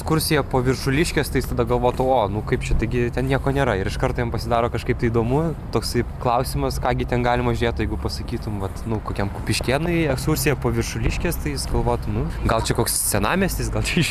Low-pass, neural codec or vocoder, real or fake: 14.4 kHz; none; real